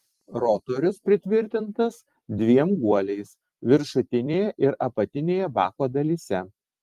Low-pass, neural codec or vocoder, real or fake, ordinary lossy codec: 14.4 kHz; vocoder, 44.1 kHz, 128 mel bands every 256 samples, BigVGAN v2; fake; Opus, 24 kbps